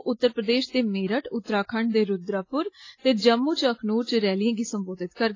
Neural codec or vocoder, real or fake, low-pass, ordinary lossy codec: none; real; 7.2 kHz; AAC, 32 kbps